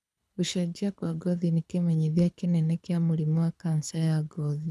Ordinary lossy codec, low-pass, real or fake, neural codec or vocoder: none; none; fake; codec, 24 kHz, 6 kbps, HILCodec